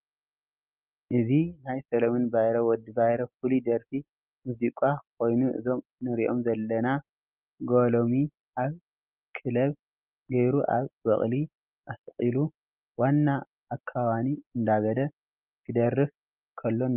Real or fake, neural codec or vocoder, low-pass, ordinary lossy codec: real; none; 3.6 kHz; Opus, 24 kbps